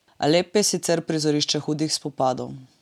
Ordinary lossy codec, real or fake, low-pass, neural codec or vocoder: none; real; 19.8 kHz; none